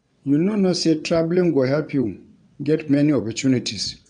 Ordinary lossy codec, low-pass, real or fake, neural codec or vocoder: none; 9.9 kHz; fake; vocoder, 22.05 kHz, 80 mel bands, WaveNeXt